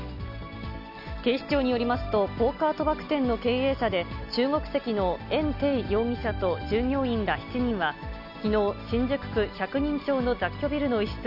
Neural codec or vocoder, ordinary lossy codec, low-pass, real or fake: none; none; 5.4 kHz; real